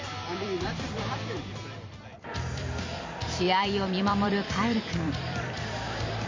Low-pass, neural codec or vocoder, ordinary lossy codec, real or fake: 7.2 kHz; vocoder, 44.1 kHz, 128 mel bands every 256 samples, BigVGAN v2; MP3, 48 kbps; fake